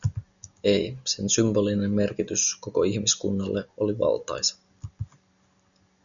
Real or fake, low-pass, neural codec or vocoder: real; 7.2 kHz; none